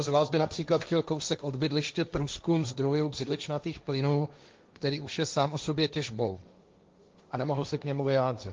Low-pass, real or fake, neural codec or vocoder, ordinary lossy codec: 7.2 kHz; fake; codec, 16 kHz, 1.1 kbps, Voila-Tokenizer; Opus, 32 kbps